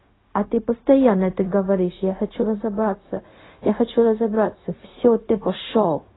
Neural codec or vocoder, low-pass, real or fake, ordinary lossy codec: codec, 16 kHz, 0.4 kbps, LongCat-Audio-Codec; 7.2 kHz; fake; AAC, 16 kbps